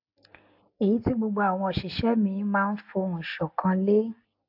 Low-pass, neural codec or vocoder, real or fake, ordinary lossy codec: 5.4 kHz; none; real; AAC, 48 kbps